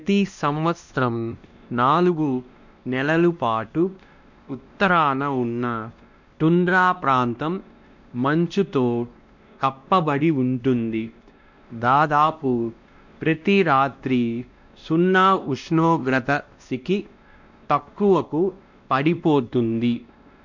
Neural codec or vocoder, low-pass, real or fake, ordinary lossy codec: codec, 16 kHz, 1 kbps, X-Codec, WavLM features, trained on Multilingual LibriSpeech; 7.2 kHz; fake; none